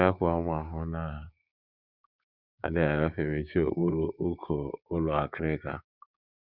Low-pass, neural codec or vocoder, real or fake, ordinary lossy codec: 5.4 kHz; codec, 16 kHz, 6 kbps, DAC; fake; Opus, 64 kbps